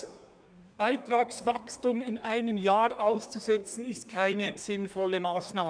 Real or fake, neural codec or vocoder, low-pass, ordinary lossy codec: fake; codec, 24 kHz, 1 kbps, SNAC; 9.9 kHz; none